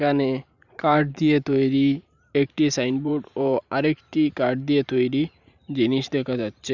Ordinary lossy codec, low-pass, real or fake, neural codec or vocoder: none; 7.2 kHz; fake; vocoder, 44.1 kHz, 128 mel bands every 512 samples, BigVGAN v2